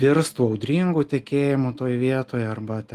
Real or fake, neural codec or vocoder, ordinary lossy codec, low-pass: real; none; Opus, 24 kbps; 14.4 kHz